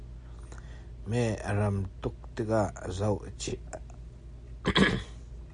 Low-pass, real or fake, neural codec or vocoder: 9.9 kHz; real; none